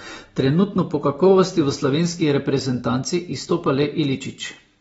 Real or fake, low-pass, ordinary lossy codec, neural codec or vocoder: real; 19.8 kHz; AAC, 24 kbps; none